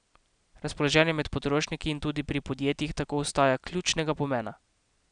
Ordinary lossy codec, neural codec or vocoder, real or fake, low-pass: none; none; real; 9.9 kHz